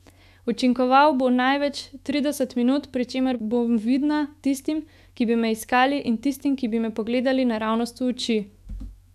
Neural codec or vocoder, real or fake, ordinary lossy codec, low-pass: autoencoder, 48 kHz, 128 numbers a frame, DAC-VAE, trained on Japanese speech; fake; none; 14.4 kHz